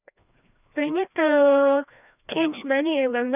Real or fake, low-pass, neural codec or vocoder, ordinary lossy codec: fake; 3.6 kHz; codec, 16 kHz, 1 kbps, FreqCodec, larger model; none